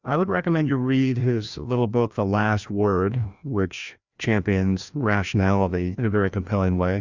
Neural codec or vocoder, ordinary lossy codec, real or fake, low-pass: codec, 16 kHz, 1 kbps, FreqCodec, larger model; Opus, 64 kbps; fake; 7.2 kHz